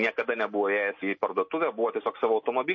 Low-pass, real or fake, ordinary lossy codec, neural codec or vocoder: 7.2 kHz; real; MP3, 32 kbps; none